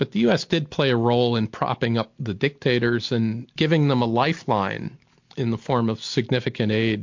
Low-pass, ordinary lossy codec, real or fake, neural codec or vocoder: 7.2 kHz; MP3, 48 kbps; real; none